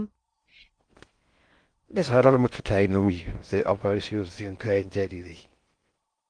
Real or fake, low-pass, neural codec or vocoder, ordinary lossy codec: fake; 9.9 kHz; codec, 16 kHz in and 24 kHz out, 0.6 kbps, FocalCodec, streaming, 4096 codes; Opus, 24 kbps